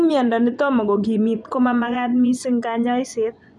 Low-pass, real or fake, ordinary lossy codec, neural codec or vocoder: none; fake; none; vocoder, 24 kHz, 100 mel bands, Vocos